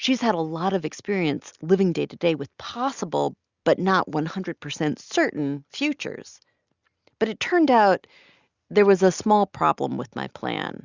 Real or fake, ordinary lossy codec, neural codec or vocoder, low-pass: real; Opus, 64 kbps; none; 7.2 kHz